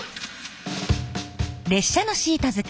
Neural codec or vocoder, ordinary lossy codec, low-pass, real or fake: none; none; none; real